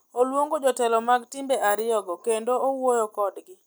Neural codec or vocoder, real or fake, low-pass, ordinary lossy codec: none; real; none; none